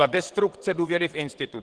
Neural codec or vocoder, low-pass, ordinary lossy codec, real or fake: vocoder, 22.05 kHz, 80 mel bands, WaveNeXt; 9.9 kHz; Opus, 16 kbps; fake